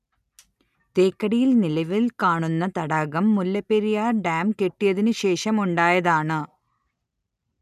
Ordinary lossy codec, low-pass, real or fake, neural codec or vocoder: none; 14.4 kHz; real; none